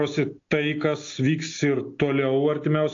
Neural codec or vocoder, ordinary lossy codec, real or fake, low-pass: none; AAC, 48 kbps; real; 7.2 kHz